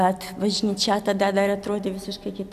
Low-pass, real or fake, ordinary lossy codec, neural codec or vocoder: 14.4 kHz; real; AAC, 96 kbps; none